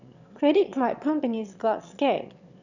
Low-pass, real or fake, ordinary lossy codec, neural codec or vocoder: 7.2 kHz; fake; none; autoencoder, 22.05 kHz, a latent of 192 numbers a frame, VITS, trained on one speaker